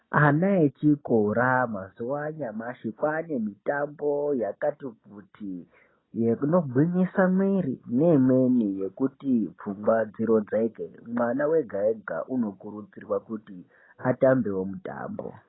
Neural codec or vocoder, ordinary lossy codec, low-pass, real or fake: none; AAC, 16 kbps; 7.2 kHz; real